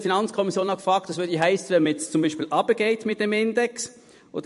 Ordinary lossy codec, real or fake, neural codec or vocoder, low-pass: MP3, 48 kbps; fake; vocoder, 44.1 kHz, 128 mel bands every 256 samples, BigVGAN v2; 14.4 kHz